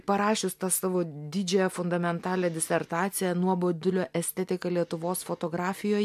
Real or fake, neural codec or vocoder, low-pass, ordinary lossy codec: real; none; 14.4 kHz; MP3, 96 kbps